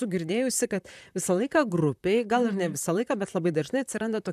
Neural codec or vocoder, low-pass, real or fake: vocoder, 44.1 kHz, 128 mel bands, Pupu-Vocoder; 14.4 kHz; fake